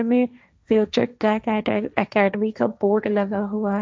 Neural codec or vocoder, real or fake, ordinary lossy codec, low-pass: codec, 16 kHz, 1.1 kbps, Voila-Tokenizer; fake; none; none